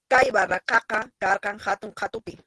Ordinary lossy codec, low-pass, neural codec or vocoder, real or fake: Opus, 16 kbps; 10.8 kHz; none; real